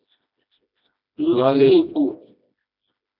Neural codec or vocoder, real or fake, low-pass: codec, 16 kHz, 1 kbps, FreqCodec, smaller model; fake; 5.4 kHz